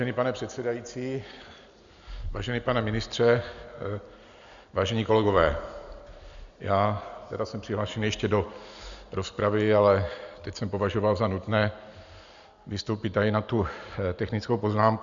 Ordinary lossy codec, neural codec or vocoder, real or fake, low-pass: Opus, 64 kbps; none; real; 7.2 kHz